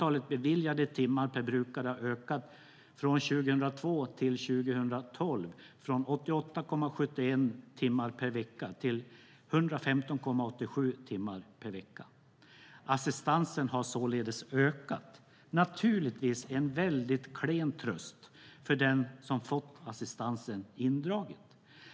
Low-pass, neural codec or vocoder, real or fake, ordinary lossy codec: none; none; real; none